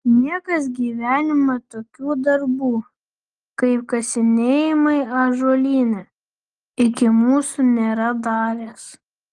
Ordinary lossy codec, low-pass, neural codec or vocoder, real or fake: Opus, 24 kbps; 10.8 kHz; none; real